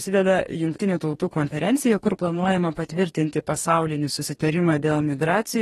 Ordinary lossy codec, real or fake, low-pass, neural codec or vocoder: AAC, 32 kbps; fake; 19.8 kHz; codec, 44.1 kHz, 2.6 kbps, DAC